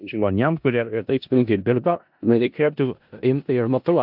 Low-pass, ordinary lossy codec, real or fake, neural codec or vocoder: 5.4 kHz; AAC, 48 kbps; fake; codec, 16 kHz in and 24 kHz out, 0.4 kbps, LongCat-Audio-Codec, four codebook decoder